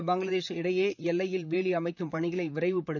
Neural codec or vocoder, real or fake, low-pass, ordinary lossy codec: vocoder, 44.1 kHz, 128 mel bands, Pupu-Vocoder; fake; 7.2 kHz; none